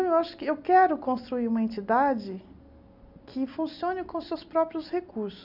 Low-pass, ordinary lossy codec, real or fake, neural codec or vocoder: 5.4 kHz; none; real; none